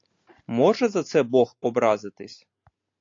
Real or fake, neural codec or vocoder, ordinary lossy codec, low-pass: real; none; AAC, 48 kbps; 7.2 kHz